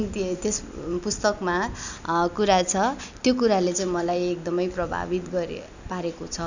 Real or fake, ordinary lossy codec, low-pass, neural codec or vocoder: real; none; 7.2 kHz; none